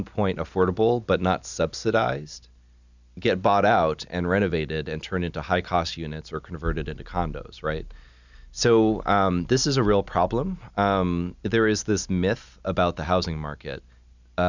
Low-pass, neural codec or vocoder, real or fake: 7.2 kHz; none; real